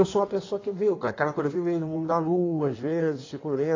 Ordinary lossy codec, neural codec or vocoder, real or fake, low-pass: AAC, 32 kbps; codec, 16 kHz in and 24 kHz out, 1.1 kbps, FireRedTTS-2 codec; fake; 7.2 kHz